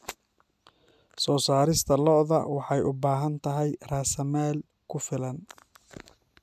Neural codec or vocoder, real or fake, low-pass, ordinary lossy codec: none; real; 14.4 kHz; none